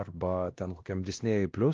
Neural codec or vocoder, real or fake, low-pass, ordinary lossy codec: codec, 16 kHz, 1 kbps, X-Codec, WavLM features, trained on Multilingual LibriSpeech; fake; 7.2 kHz; Opus, 16 kbps